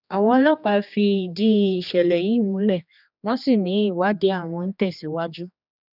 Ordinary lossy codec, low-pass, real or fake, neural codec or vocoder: none; 5.4 kHz; fake; codec, 16 kHz, 2 kbps, X-Codec, HuBERT features, trained on general audio